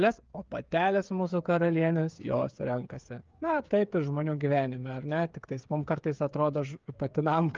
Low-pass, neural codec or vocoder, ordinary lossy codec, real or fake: 7.2 kHz; codec, 16 kHz, 8 kbps, FreqCodec, smaller model; Opus, 24 kbps; fake